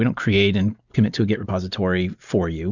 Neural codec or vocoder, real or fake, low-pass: none; real; 7.2 kHz